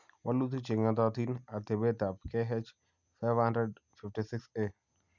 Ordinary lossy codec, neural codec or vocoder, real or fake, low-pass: none; none; real; 7.2 kHz